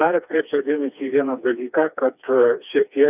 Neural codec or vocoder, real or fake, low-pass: codec, 16 kHz, 2 kbps, FreqCodec, smaller model; fake; 3.6 kHz